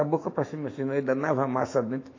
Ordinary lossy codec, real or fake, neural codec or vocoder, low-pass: AAC, 32 kbps; fake; autoencoder, 48 kHz, 128 numbers a frame, DAC-VAE, trained on Japanese speech; 7.2 kHz